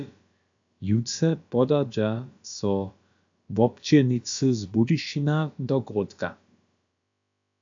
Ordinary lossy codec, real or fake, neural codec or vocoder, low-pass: AAC, 64 kbps; fake; codec, 16 kHz, about 1 kbps, DyCAST, with the encoder's durations; 7.2 kHz